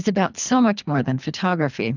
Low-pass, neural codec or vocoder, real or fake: 7.2 kHz; codec, 24 kHz, 3 kbps, HILCodec; fake